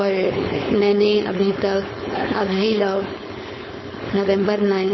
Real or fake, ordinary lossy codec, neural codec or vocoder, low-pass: fake; MP3, 24 kbps; codec, 16 kHz, 4.8 kbps, FACodec; 7.2 kHz